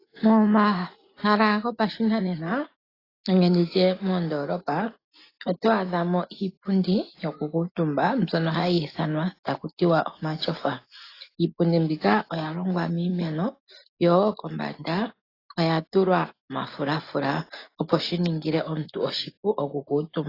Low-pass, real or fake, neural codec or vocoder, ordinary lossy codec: 5.4 kHz; real; none; AAC, 24 kbps